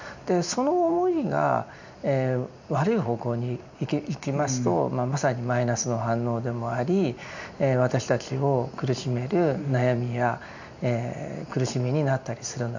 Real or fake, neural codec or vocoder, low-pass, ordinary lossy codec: real; none; 7.2 kHz; none